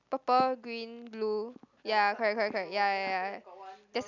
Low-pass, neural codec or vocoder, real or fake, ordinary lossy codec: 7.2 kHz; none; real; none